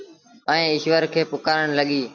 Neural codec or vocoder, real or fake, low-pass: none; real; 7.2 kHz